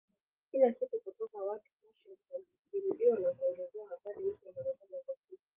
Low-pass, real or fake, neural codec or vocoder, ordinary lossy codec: 3.6 kHz; fake; codec, 16 kHz, 16 kbps, FreqCodec, larger model; Opus, 32 kbps